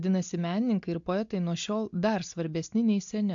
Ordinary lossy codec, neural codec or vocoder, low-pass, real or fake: AAC, 64 kbps; none; 7.2 kHz; real